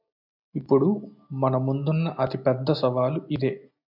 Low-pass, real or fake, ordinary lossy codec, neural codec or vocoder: 5.4 kHz; real; MP3, 48 kbps; none